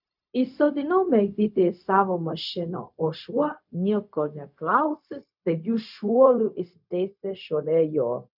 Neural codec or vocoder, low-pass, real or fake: codec, 16 kHz, 0.4 kbps, LongCat-Audio-Codec; 5.4 kHz; fake